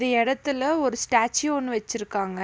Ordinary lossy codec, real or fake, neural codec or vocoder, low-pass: none; real; none; none